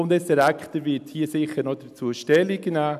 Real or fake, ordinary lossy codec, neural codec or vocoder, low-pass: real; none; none; 14.4 kHz